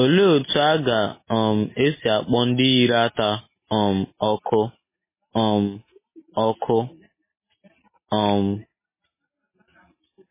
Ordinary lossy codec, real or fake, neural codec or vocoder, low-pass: MP3, 16 kbps; real; none; 3.6 kHz